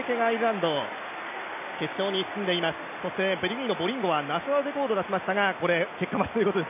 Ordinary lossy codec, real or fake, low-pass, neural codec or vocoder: MP3, 24 kbps; real; 3.6 kHz; none